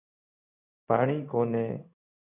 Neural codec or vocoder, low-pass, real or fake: codec, 16 kHz in and 24 kHz out, 1 kbps, XY-Tokenizer; 3.6 kHz; fake